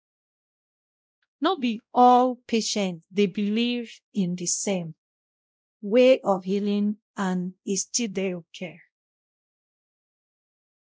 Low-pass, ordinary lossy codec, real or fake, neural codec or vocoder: none; none; fake; codec, 16 kHz, 0.5 kbps, X-Codec, WavLM features, trained on Multilingual LibriSpeech